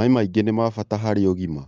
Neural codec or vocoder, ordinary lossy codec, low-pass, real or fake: none; Opus, 24 kbps; 7.2 kHz; real